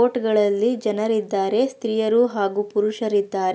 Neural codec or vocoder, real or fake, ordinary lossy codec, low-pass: none; real; none; none